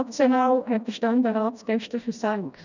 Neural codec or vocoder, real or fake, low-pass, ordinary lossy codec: codec, 16 kHz, 1 kbps, FreqCodec, smaller model; fake; 7.2 kHz; none